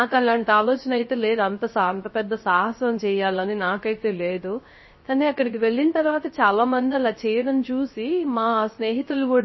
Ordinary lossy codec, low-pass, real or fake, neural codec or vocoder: MP3, 24 kbps; 7.2 kHz; fake; codec, 16 kHz, 0.3 kbps, FocalCodec